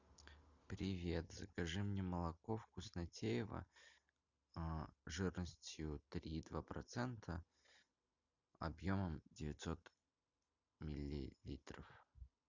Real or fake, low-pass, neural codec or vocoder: real; 7.2 kHz; none